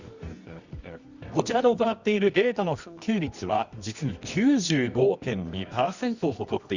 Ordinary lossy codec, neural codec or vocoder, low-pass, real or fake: Opus, 64 kbps; codec, 24 kHz, 0.9 kbps, WavTokenizer, medium music audio release; 7.2 kHz; fake